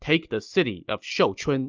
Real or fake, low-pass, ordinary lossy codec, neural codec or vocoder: real; 7.2 kHz; Opus, 24 kbps; none